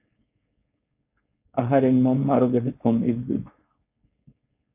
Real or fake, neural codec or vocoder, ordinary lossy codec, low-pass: fake; codec, 16 kHz, 4.8 kbps, FACodec; AAC, 24 kbps; 3.6 kHz